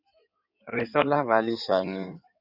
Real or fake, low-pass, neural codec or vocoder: fake; 5.4 kHz; codec, 16 kHz in and 24 kHz out, 2.2 kbps, FireRedTTS-2 codec